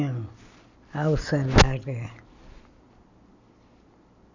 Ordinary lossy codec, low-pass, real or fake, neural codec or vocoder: none; 7.2 kHz; real; none